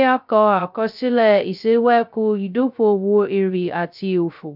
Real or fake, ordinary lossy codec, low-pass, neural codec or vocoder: fake; none; 5.4 kHz; codec, 16 kHz, 0.2 kbps, FocalCodec